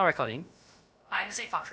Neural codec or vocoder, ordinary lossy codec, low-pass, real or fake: codec, 16 kHz, about 1 kbps, DyCAST, with the encoder's durations; none; none; fake